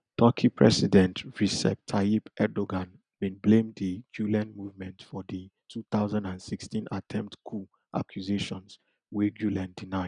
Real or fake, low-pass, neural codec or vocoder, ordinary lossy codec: real; 9.9 kHz; none; none